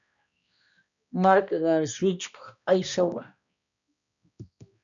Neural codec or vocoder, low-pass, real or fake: codec, 16 kHz, 1 kbps, X-Codec, HuBERT features, trained on balanced general audio; 7.2 kHz; fake